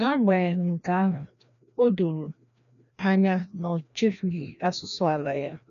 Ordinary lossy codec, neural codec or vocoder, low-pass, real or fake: none; codec, 16 kHz, 1 kbps, FreqCodec, larger model; 7.2 kHz; fake